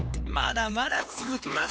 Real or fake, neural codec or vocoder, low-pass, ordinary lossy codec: fake; codec, 16 kHz, 2 kbps, X-Codec, HuBERT features, trained on LibriSpeech; none; none